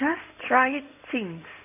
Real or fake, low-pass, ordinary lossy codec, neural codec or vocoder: fake; 3.6 kHz; none; codec, 16 kHz in and 24 kHz out, 2.2 kbps, FireRedTTS-2 codec